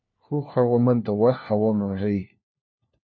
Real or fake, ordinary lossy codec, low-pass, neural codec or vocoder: fake; MP3, 32 kbps; 7.2 kHz; codec, 16 kHz, 1 kbps, FunCodec, trained on LibriTTS, 50 frames a second